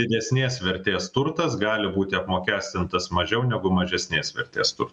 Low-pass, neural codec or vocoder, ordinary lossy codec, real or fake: 10.8 kHz; none; MP3, 96 kbps; real